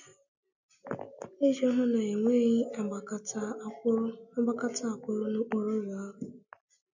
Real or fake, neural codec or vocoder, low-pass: real; none; 7.2 kHz